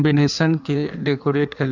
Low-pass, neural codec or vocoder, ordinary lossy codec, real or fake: 7.2 kHz; codec, 16 kHz in and 24 kHz out, 1.1 kbps, FireRedTTS-2 codec; none; fake